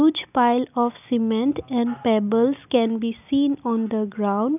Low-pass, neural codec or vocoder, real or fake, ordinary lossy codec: 3.6 kHz; none; real; none